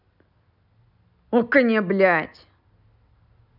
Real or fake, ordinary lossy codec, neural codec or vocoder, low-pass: real; none; none; 5.4 kHz